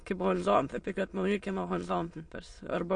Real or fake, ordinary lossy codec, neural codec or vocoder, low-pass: fake; MP3, 48 kbps; autoencoder, 22.05 kHz, a latent of 192 numbers a frame, VITS, trained on many speakers; 9.9 kHz